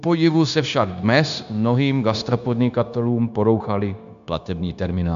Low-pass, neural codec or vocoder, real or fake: 7.2 kHz; codec, 16 kHz, 0.9 kbps, LongCat-Audio-Codec; fake